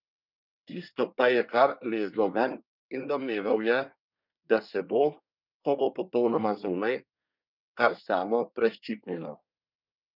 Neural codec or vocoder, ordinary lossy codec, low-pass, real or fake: codec, 24 kHz, 1 kbps, SNAC; none; 5.4 kHz; fake